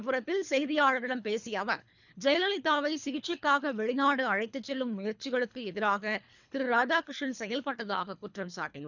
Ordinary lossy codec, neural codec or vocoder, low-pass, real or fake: none; codec, 24 kHz, 3 kbps, HILCodec; 7.2 kHz; fake